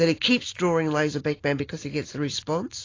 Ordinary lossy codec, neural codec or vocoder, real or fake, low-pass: AAC, 32 kbps; none; real; 7.2 kHz